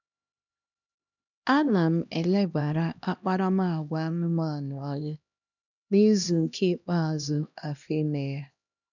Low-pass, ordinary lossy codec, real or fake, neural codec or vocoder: 7.2 kHz; none; fake; codec, 16 kHz, 1 kbps, X-Codec, HuBERT features, trained on LibriSpeech